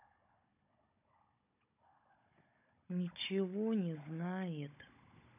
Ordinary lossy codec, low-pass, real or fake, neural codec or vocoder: AAC, 24 kbps; 3.6 kHz; fake; codec, 16 kHz, 16 kbps, FunCodec, trained on Chinese and English, 50 frames a second